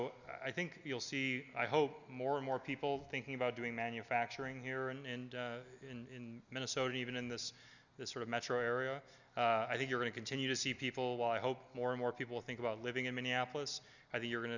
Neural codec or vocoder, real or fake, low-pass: none; real; 7.2 kHz